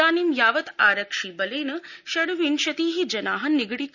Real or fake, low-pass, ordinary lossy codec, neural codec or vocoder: real; 7.2 kHz; none; none